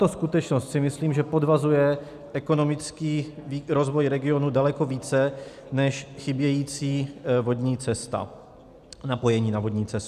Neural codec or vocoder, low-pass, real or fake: none; 14.4 kHz; real